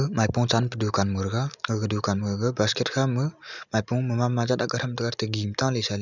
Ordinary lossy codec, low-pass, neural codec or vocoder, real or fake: none; 7.2 kHz; none; real